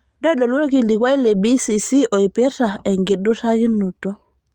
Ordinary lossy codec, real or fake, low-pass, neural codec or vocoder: Opus, 32 kbps; fake; 19.8 kHz; codec, 44.1 kHz, 7.8 kbps, DAC